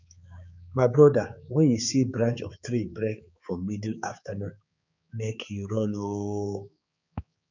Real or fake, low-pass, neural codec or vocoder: fake; 7.2 kHz; codec, 16 kHz, 4 kbps, X-Codec, HuBERT features, trained on balanced general audio